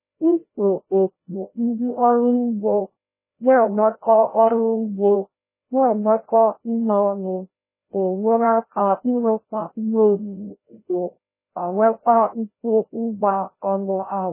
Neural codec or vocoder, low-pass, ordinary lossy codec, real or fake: codec, 16 kHz, 0.5 kbps, FreqCodec, larger model; 3.6 kHz; MP3, 16 kbps; fake